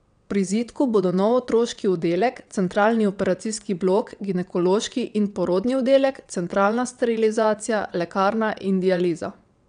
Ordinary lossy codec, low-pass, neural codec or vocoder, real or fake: none; 9.9 kHz; vocoder, 22.05 kHz, 80 mel bands, WaveNeXt; fake